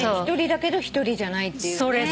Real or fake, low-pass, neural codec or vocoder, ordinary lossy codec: real; none; none; none